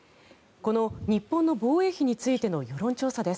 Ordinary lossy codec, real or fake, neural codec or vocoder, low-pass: none; real; none; none